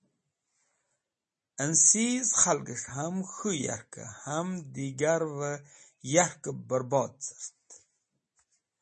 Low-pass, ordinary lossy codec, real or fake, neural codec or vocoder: 10.8 kHz; MP3, 32 kbps; real; none